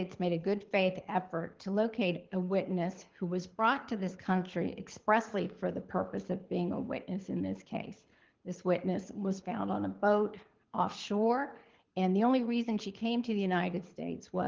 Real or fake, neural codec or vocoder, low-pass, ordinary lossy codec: fake; codec, 24 kHz, 6 kbps, HILCodec; 7.2 kHz; Opus, 16 kbps